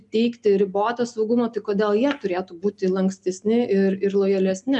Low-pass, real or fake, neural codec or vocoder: 10.8 kHz; real; none